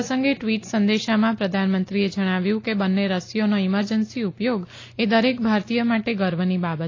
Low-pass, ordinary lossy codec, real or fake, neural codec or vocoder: 7.2 kHz; AAC, 32 kbps; real; none